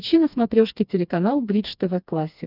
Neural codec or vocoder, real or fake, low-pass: codec, 16 kHz, 2 kbps, FreqCodec, smaller model; fake; 5.4 kHz